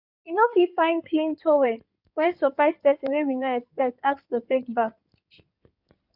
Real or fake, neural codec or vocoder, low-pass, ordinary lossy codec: fake; codec, 16 kHz in and 24 kHz out, 2.2 kbps, FireRedTTS-2 codec; 5.4 kHz; none